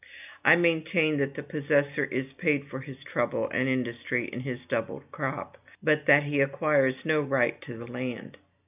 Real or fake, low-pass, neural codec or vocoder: real; 3.6 kHz; none